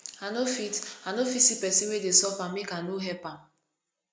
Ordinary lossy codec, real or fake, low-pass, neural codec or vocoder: none; real; none; none